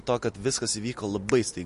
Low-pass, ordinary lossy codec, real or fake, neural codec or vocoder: 10.8 kHz; MP3, 48 kbps; real; none